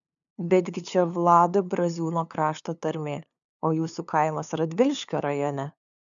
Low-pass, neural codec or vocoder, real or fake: 7.2 kHz; codec, 16 kHz, 2 kbps, FunCodec, trained on LibriTTS, 25 frames a second; fake